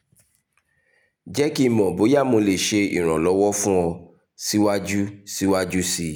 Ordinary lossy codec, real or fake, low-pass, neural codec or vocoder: none; real; none; none